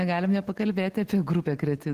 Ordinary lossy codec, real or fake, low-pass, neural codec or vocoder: Opus, 16 kbps; real; 14.4 kHz; none